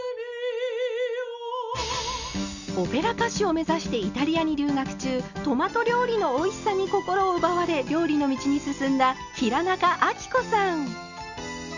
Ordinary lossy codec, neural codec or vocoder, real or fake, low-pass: none; none; real; 7.2 kHz